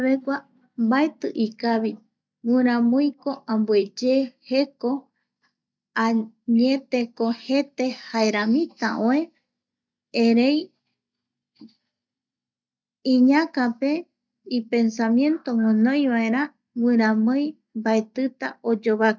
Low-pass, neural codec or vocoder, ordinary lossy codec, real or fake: none; none; none; real